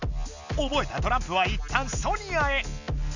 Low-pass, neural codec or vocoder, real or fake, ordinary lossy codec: 7.2 kHz; none; real; none